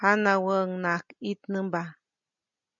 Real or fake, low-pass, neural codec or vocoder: real; 7.2 kHz; none